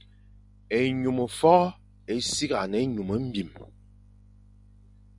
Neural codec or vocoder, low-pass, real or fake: none; 10.8 kHz; real